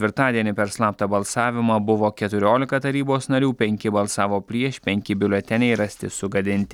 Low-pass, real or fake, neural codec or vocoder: 19.8 kHz; real; none